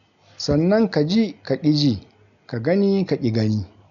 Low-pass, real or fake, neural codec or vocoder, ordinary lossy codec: 7.2 kHz; real; none; none